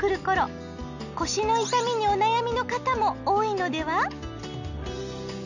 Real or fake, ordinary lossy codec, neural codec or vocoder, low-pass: real; none; none; 7.2 kHz